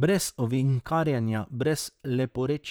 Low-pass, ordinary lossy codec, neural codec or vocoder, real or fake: none; none; vocoder, 44.1 kHz, 128 mel bands, Pupu-Vocoder; fake